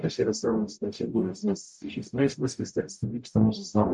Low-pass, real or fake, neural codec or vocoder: 10.8 kHz; fake; codec, 44.1 kHz, 0.9 kbps, DAC